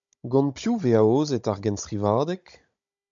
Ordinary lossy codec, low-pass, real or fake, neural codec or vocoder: MP3, 64 kbps; 7.2 kHz; fake; codec, 16 kHz, 16 kbps, FunCodec, trained on Chinese and English, 50 frames a second